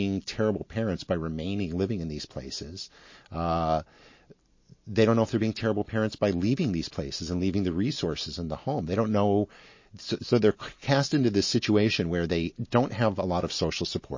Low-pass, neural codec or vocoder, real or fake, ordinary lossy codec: 7.2 kHz; none; real; MP3, 32 kbps